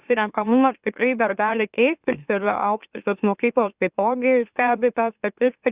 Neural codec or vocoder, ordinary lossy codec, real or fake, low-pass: autoencoder, 44.1 kHz, a latent of 192 numbers a frame, MeloTTS; Opus, 64 kbps; fake; 3.6 kHz